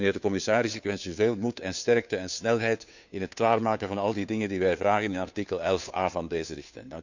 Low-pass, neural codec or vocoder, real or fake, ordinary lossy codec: 7.2 kHz; codec, 16 kHz, 2 kbps, FunCodec, trained on Chinese and English, 25 frames a second; fake; none